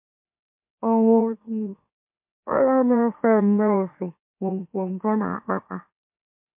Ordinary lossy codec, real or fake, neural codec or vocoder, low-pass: MP3, 32 kbps; fake; autoencoder, 44.1 kHz, a latent of 192 numbers a frame, MeloTTS; 3.6 kHz